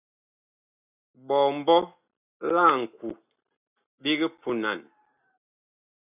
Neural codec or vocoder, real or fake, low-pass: none; real; 3.6 kHz